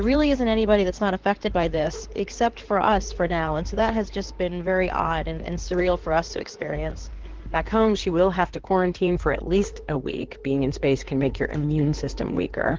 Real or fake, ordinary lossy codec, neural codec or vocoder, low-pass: fake; Opus, 16 kbps; codec, 16 kHz in and 24 kHz out, 2.2 kbps, FireRedTTS-2 codec; 7.2 kHz